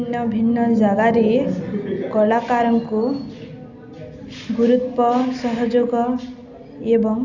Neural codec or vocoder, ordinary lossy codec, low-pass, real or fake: none; none; 7.2 kHz; real